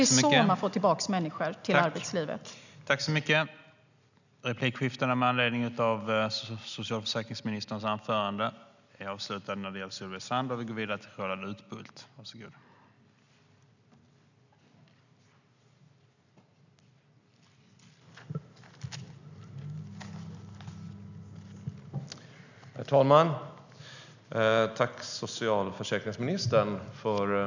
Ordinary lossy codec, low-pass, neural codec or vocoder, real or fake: none; 7.2 kHz; none; real